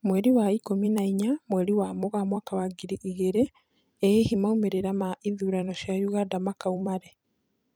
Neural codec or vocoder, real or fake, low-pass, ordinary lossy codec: vocoder, 44.1 kHz, 128 mel bands every 256 samples, BigVGAN v2; fake; none; none